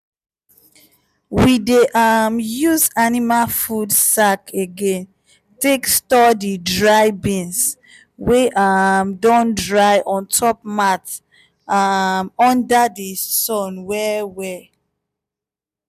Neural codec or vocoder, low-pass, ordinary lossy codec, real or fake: none; 14.4 kHz; none; real